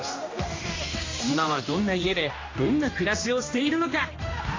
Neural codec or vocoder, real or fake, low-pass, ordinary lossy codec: codec, 16 kHz, 1 kbps, X-Codec, HuBERT features, trained on general audio; fake; 7.2 kHz; AAC, 32 kbps